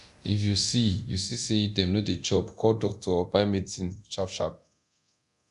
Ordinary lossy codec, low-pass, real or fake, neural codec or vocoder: none; 10.8 kHz; fake; codec, 24 kHz, 0.9 kbps, DualCodec